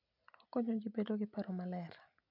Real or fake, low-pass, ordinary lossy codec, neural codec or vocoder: real; 5.4 kHz; none; none